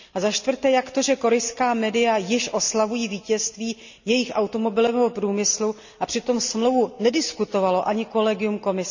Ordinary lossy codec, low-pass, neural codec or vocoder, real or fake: none; 7.2 kHz; none; real